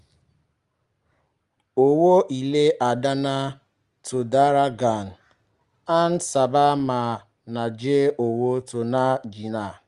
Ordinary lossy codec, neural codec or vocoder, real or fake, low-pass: Opus, 32 kbps; none; real; 10.8 kHz